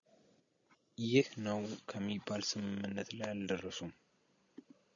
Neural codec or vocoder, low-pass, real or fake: none; 7.2 kHz; real